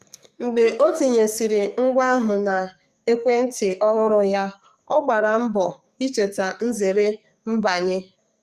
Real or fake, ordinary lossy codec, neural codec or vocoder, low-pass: fake; Opus, 64 kbps; codec, 44.1 kHz, 2.6 kbps, SNAC; 14.4 kHz